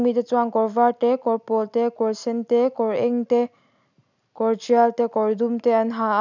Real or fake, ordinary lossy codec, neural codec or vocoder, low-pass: real; none; none; 7.2 kHz